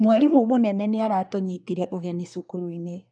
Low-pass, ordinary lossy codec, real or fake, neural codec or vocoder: 9.9 kHz; none; fake; codec, 24 kHz, 1 kbps, SNAC